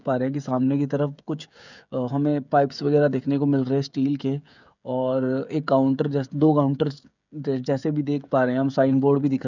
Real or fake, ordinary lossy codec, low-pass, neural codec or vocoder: fake; none; 7.2 kHz; codec, 16 kHz, 16 kbps, FreqCodec, smaller model